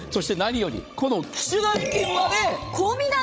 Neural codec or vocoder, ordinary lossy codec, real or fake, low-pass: codec, 16 kHz, 16 kbps, FreqCodec, larger model; none; fake; none